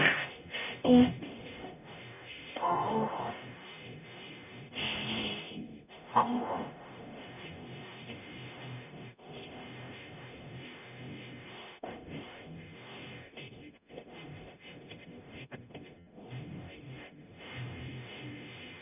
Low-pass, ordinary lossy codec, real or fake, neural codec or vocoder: 3.6 kHz; none; fake; codec, 44.1 kHz, 0.9 kbps, DAC